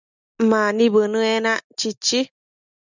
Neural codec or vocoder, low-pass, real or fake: none; 7.2 kHz; real